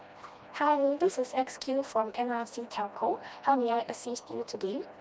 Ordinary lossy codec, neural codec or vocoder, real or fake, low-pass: none; codec, 16 kHz, 1 kbps, FreqCodec, smaller model; fake; none